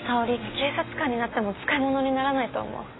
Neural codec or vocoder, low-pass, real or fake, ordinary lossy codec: none; 7.2 kHz; real; AAC, 16 kbps